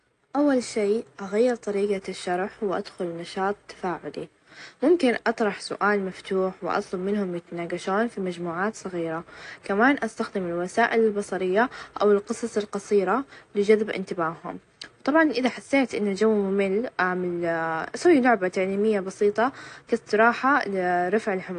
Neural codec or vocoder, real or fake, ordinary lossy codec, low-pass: none; real; AAC, 48 kbps; 10.8 kHz